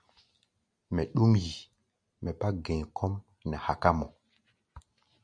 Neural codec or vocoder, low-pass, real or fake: none; 9.9 kHz; real